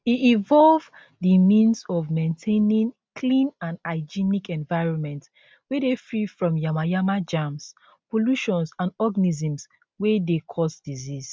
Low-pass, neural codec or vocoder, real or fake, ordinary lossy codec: none; none; real; none